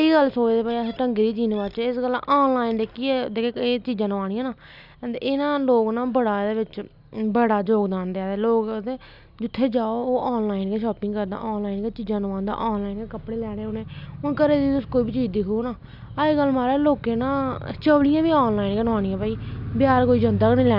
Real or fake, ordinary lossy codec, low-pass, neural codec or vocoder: real; none; 5.4 kHz; none